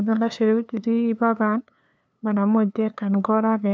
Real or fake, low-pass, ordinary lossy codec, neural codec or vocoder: fake; none; none; codec, 16 kHz, 2 kbps, FunCodec, trained on LibriTTS, 25 frames a second